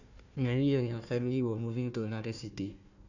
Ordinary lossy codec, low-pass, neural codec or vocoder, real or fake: none; 7.2 kHz; codec, 16 kHz, 1 kbps, FunCodec, trained on Chinese and English, 50 frames a second; fake